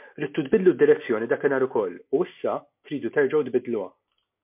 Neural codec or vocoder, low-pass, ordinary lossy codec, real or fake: none; 3.6 kHz; MP3, 32 kbps; real